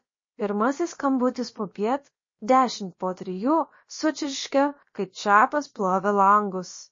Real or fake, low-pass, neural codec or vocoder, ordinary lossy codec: fake; 7.2 kHz; codec, 16 kHz, about 1 kbps, DyCAST, with the encoder's durations; MP3, 32 kbps